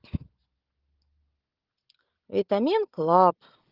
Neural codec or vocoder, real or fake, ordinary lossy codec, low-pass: none; real; Opus, 16 kbps; 5.4 kHz